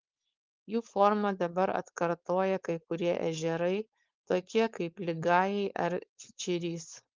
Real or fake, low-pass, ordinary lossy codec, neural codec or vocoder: fake; 7.2 kHz; Opus, 32 kbps; codec, 16 kHz, 4.8 kbps, FACodec